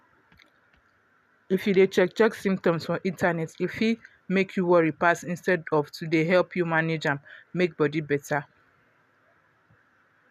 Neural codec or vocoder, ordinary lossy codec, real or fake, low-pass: none; none; real; 14.4 kHz